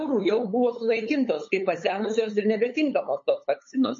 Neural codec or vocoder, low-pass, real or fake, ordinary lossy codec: codec, 16 kHz, 8 kbps, FunCodec, trained on LibriTTS, 25 frames a second; 7.2 kHz; fake; MP3, 32 kbps